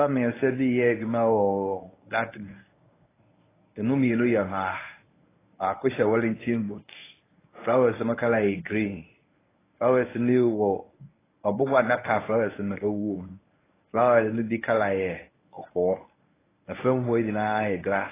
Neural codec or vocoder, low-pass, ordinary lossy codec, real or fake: codec, 24 kHz, 0.9 kbps, WavTokenizer, medium speech release version 1; 3.6 kHz; AAC, 16 kbps; fake